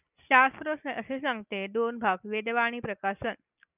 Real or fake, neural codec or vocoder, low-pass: real; none; 3.6 kHz